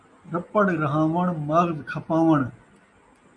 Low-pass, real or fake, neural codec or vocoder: 10.8 kHz; real; none